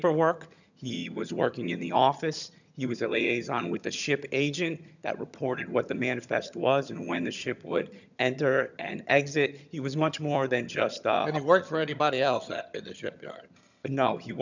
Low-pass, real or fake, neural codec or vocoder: 7.2 kHz; fake; vocoder, 22.05 kHz, 80 mel bands, HiFi-GAN